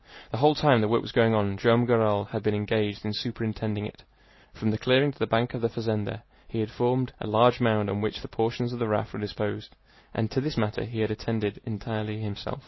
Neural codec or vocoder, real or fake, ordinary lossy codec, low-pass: none; real; MP3, 24 kbps; 7.2 kHz